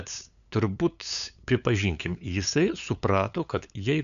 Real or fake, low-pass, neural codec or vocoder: fake; 7.2 kHz; codec, 16 kHz, 8 kbps, FunCodec, trained on LibriTTS, 25 frames a second